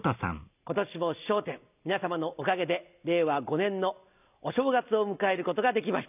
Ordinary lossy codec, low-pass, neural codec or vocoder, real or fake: none; 3.6 kHz; none; real